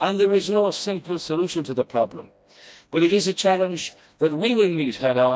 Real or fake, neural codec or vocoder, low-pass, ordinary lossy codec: fake; codec, 16 kHz, 1 kbps, FreqCodec, smaller model; none; none